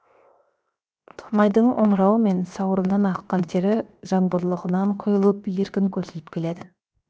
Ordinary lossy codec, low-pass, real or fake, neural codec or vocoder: none; none; fake; codec, 16 kHz, 0.7 kbps, FocalCodec